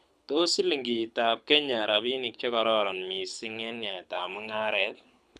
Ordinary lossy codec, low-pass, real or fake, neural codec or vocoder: none; none; fake; codec, 24 kHz, 6 kbps, HILCodec